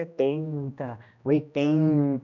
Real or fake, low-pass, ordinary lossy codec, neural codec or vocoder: fake; 7.2 kHz; none; codec, 16 kHz, 1 kbps, X-Codec, HuBERT features, trained on general audio